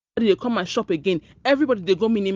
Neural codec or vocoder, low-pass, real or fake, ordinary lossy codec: none; 7.2 kHz; real; Opus, 32 kbps